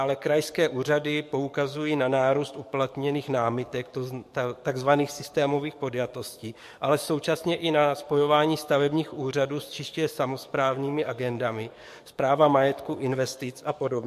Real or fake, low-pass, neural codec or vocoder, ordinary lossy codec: fake; 14.4 kHz; codec, 44.1 kHz, 7.8 kbps, DAC; MP3, 64 kbps